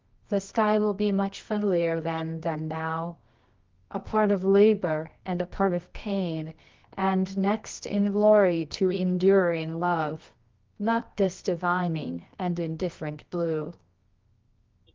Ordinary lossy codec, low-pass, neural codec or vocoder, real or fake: Opus, 32 kbps; 7.2 kHz; codec, 24 kHz, 0.9 kbps, WavTokenizer, medium music audio release; fake